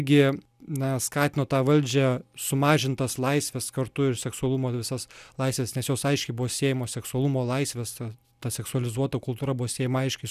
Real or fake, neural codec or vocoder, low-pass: real; none; 14.4 kHz